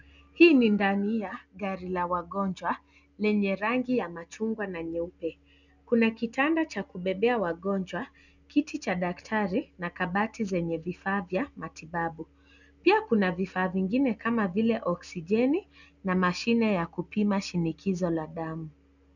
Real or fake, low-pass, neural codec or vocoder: real; 7.2 kHz; none